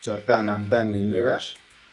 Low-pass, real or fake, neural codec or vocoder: 10.8 kHz; fake; codec, 24 kHz, 0.9 kbps, WavTokenizer, medium music audio release